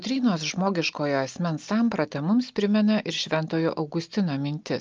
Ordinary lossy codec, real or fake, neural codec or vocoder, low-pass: Opus, 24 kbps; real; none; 7.2 kHz